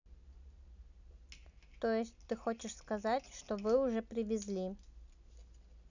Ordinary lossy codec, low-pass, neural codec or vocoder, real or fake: none; 7.2 kHz; none; real